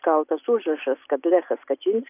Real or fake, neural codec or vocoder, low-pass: real; none; 3.6 kHz